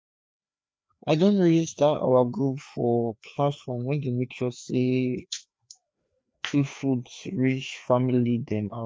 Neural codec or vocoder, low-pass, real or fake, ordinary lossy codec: codec, 16 kHz, 2 kbps, FreqCodec, larger model; none; fake; none